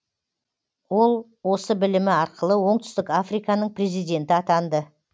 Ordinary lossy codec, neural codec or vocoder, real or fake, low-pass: none; none; real; none